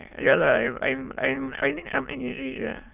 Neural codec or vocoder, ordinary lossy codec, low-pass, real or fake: autoencoder, 22.05 kHz, a latent of 192 numbers a frame, VITS, trained on many speakers; none; 3.6 kHz; fake